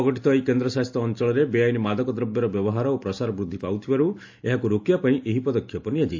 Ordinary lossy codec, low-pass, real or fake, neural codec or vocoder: AAC, 48 kbps; 7.2 kHz; real; none